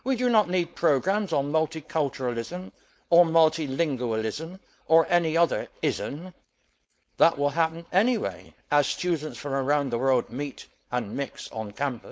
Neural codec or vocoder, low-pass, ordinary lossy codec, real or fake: codec, 16 kHz, 4.8 kbps, FACodec; none; none; fake